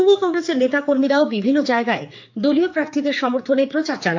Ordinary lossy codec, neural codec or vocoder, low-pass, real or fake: AAC, 48 kbps; codec, 16 kHz, 4 kbps, X-Codec, HuBERT features, trained on general audio; 7.2 kHz; fake